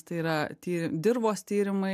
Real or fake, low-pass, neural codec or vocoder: real; 14.4 kHz; none